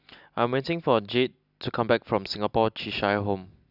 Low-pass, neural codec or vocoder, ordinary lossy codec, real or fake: 5.4 kHz; none; none; real